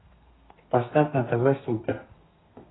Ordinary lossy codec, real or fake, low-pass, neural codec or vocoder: AAC, 16 kbps; fake; 7.2 kHz; codec, 32 kHz, 1.9 kbps, SNAC